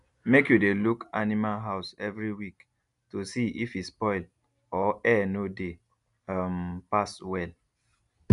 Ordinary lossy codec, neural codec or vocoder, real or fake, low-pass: none; none; real; 10.8 kHz